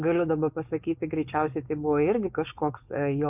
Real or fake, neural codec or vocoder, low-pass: fake; codec, 16 kHz, 4.8 kbps, FACodec; 3.6 kHz